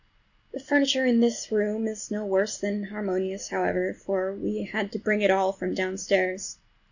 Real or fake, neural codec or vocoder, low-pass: real; none; 7.2 kHz